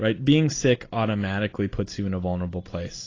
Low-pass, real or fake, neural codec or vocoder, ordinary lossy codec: 7.2 kHz; real; none; AAC, 32 kbps